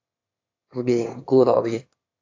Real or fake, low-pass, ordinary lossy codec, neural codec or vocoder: fake; 7.2 kHz; AAC, 48 kbps; autoencoder, 22.05 kHz, a latent of 192 numbers a frame, VITS, trained on one speaker